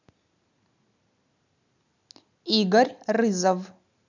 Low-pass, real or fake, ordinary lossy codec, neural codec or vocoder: 7.2 kHz; real; none; none